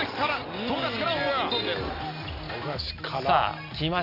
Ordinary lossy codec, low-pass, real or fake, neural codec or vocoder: none; 5.4 kHz; real; none